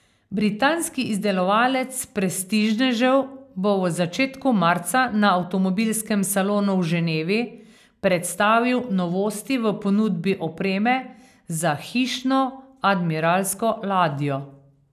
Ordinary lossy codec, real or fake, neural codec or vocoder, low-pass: none; real; none; 14.4 kHz